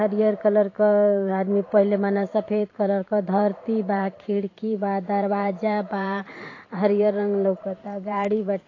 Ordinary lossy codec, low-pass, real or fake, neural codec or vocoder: AAC, 32 kbps; 7.2 kHz; fake; vocoder, 44.1 kHz, 128 mel bands every 512 samples, BigVGAN v2